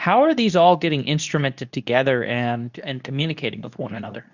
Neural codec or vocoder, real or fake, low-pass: codec, 24 kHz, 0.9 kbps, WavTokenizer, medium speech release version 2; fake; 7.2 kHz